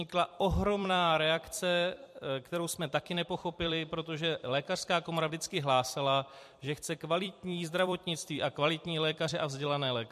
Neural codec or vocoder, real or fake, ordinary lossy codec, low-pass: vocoder, 44.1 kHz, 128 mel bands every 256 samples, BigVGAN v2; fake; MP3, 64 kbps; 14.4 kHz